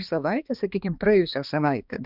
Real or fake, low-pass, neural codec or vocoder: fake; 5.4 kHz; codec, 16 kHz, 2 kbps, X-Codec, HuBERT features, trained on balanced general audio